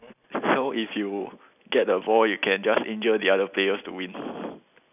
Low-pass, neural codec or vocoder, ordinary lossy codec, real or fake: 3.6 kHz; none; none; real